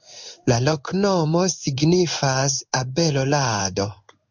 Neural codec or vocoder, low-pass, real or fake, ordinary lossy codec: codec, 16 kHz in and 24 kHz out, 1 kbps, XY-Tokenizer; 7.2 kHz; fake; MP3, 64 kbps